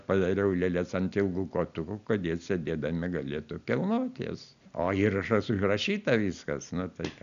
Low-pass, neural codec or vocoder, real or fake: 7.2 kHz; none; real